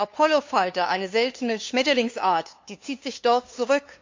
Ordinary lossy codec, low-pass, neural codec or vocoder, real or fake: MP3, 64 kbps; 7.2 kHz; codec, 16 kHz, 2 kbps, FunCodec, trained on LibriTTS, 25 frames a second; fake